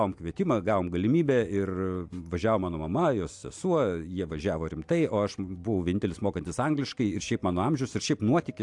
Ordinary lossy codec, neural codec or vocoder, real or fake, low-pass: AAC, 64 kbps; none; real; 10.8 kHz